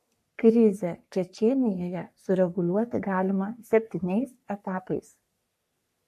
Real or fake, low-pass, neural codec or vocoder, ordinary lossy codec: fake; 14.4 kHz; codec, 44.1 kHz, 3.4 kbps, Pupu-Codec; MP3, 64 kbps